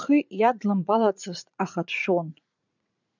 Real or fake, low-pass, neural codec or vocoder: fake; 7.2 kHz; vocoder, 44.1 kHz, 80 mel bands, Vocos